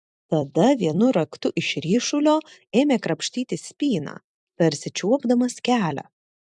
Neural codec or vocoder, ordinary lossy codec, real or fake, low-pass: vocoder, 44.1 kHz, 128 mel bands every 256 samples, BigVGAN v2; MP3, 96 kbps; fake; 10.8 kHz